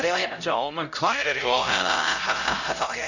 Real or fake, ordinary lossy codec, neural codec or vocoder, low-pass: fake; none; codec, 16 kHz, 0.5 kbps, X-Codec, HuBERT features, trained on LibriSpeech; 7.2 kHz